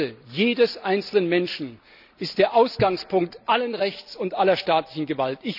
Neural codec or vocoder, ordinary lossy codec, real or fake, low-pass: none; none; real; 5.4 kHz